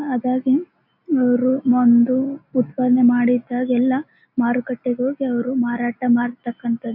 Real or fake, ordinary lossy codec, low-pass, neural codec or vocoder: real; AAC, 48 kbps; 5.4 kHz; none